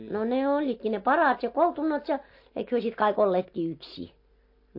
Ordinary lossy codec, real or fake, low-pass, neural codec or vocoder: MP3, 32 kbps; real; 5.4 kHz; none